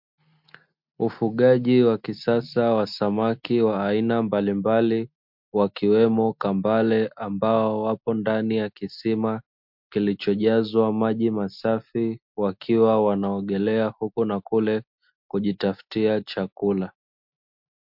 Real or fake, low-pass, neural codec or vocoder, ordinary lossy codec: real; 5.4 kHz; none; MP3, 48 kbps